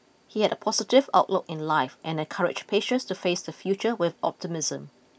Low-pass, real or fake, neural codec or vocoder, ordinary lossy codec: none; real; none; none